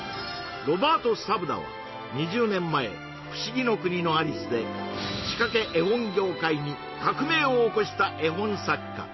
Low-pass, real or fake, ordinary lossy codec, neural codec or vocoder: 7.2 kHz; real; MP3, 24 kbps; none